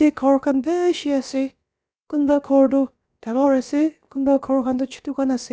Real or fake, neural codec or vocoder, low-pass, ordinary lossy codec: fake; codec, 16 kHz, about 1 kbps, DyCAST, with the encoder's durations; none; none